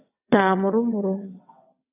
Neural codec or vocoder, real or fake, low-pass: vocoder, 22.05 kHz, 80 mel bands, WaveNeXt; fake; 3.6 kHz